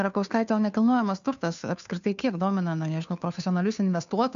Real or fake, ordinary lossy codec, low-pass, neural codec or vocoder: fake; MP3, 96 kbps; 7.2 kHz; codec, 16 kHz, 2 kbps, FunCodec, trained on Chinese and English, 25 frames a second